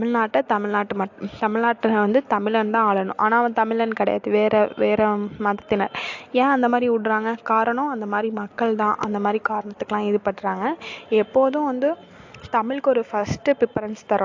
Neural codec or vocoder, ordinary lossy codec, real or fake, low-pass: none; AAC, 48 kbps; real; 7.2 kHz